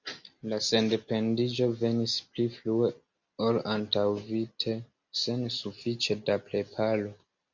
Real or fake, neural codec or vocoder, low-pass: real; none; 7.2 kHz